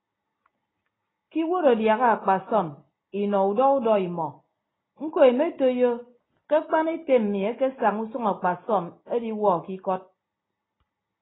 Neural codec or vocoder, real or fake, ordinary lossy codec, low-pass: none; real; AAC, 16 kbps; 7.2 kHz